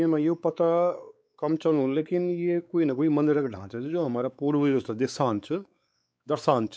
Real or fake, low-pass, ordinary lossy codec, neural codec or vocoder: fake; none; none; codec, 16 kHz, 4 kbps, X-Codec, WavLM features, trained on Multilingual LibriSpeech